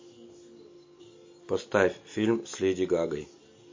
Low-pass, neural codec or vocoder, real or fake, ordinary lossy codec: 7.2 kHz; none; real; MP3, 32 kbps